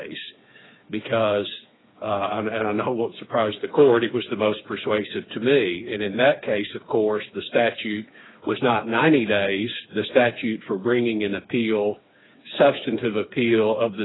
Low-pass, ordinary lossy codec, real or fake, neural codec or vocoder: 7.2 kHz; AAC, 16 kbps; fake; codec, 16 kHz, 4 kbps, FreqCodec, smaller model